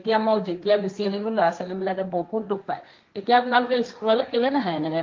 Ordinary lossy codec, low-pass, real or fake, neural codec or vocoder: Opus, 24 kbps; 7.2 kHz; fake; codec, 16 kHz, 1.1 kbps, Voila-Tokenizer